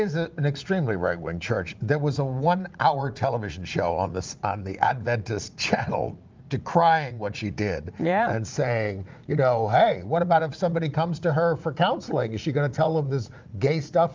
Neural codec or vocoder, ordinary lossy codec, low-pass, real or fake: vocoder, 44.1 kHz, 80 mel bands, Vocos; Opus, 24 kbps; 7.2 kHz; fake